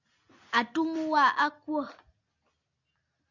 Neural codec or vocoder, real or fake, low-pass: none; real; 7.2 kHz